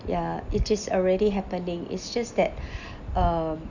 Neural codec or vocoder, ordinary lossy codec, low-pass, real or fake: none; none; 7.2 kHz; real